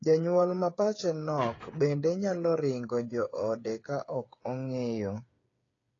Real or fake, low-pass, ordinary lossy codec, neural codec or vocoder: fake; 7.2 kHz; AAC, 32 kbps; codec, 16 kHz, 8 kbps, FreqCodec, smaller model